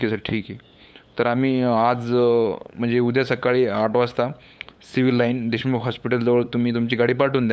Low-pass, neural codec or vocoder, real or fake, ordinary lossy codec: none; codec, 16 kHz, 8 kbps, FunCodec, trained on LibriTTS, 25 frames a second; fake; none